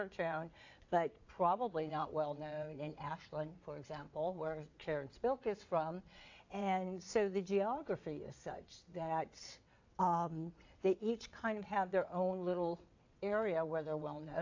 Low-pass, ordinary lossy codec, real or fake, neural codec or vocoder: 7.2 kHz; AAC, 48 kbps; fake; vocoder, 22.05 kHz, 80 mel bands, WaveNeXt